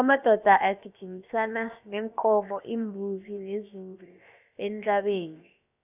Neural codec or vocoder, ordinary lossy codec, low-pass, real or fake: codec, 16 kHz, about 1 kbps, DyCAST, with the encoder's durations; none; 3.6 kHz; fake